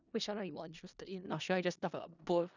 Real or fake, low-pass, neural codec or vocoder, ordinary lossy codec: fake; 7.2 kHz; codec, 16 kHz in and 24 kHz out, 0.4 kbps, LongCat-Audio-Codec, four codebook decoder; none